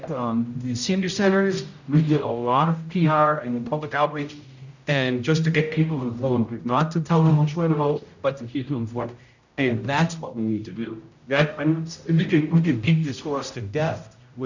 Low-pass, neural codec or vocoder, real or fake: 7.2 kHz; codec, 16 kHz, 0.5 kbps, X-Codec, HuBERT features, trained on general audio; fake